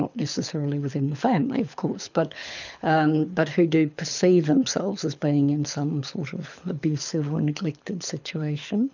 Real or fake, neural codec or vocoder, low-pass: fake; codec, 24 kHz, 6 kbps, HILCodec; 7.2 kHz